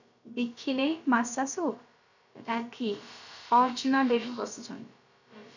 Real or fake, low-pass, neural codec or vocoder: fake; 7.2 kHz; codec, 16 kHz, about 1 kbps, DyCAST, with the encoder's durations